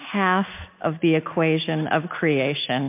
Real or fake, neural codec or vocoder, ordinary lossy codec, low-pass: real; none; MP3, 32 kbps; 3.6 kHz